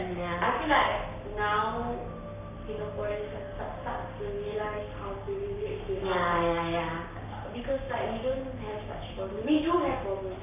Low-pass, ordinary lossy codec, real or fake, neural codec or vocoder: 3.6 kHz; none; fake; codec, 44.1 kHz, 7.8 kbps, Pupu-Codec